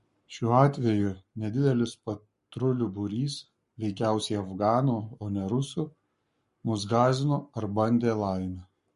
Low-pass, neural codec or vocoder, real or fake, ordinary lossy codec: 14.4 kHz; codec, 44.1 kHz, 7.8 kbps, Pupu-Codec; fake; MP3, 48 kbps